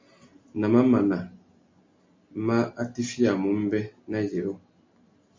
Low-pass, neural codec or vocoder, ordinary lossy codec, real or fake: 7.2 kHz; none; MP3, 48 kbps; real